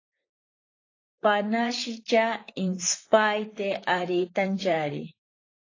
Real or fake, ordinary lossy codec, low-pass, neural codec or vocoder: fake; AAC, 32 kbps; 7.2 kHz; vocoder, 44.1 kHz, 128 mel bands, Pupu-Vocoder